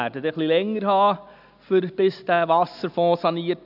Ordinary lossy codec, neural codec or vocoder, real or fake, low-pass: none; none; real; 5.4 kHz